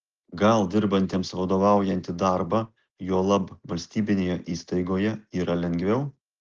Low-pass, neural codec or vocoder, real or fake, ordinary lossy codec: 7.2 kHz; none; real; Opus, 16 kbps